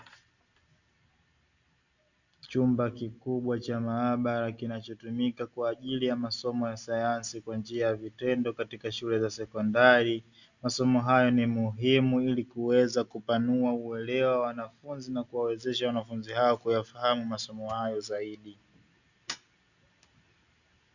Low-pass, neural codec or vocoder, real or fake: 7.2 kHz; none; real